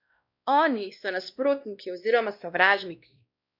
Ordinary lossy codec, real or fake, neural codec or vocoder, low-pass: none; fake; codec, 16 kHz, 1 kbps, X-Codec, WavLM features, trained on Multilingual LibriSpeech; 5.4 kHz